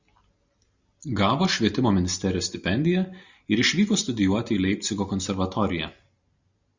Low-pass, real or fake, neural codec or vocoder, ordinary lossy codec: 7.2 kHz; real; none; Opus, 64 kbps